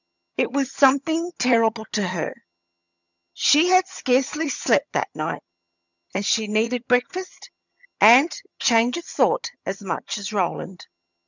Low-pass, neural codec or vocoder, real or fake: 7.2 kHz; vocoder, 22.05 kHz, 80 mel bands, HiFi-GAN; fake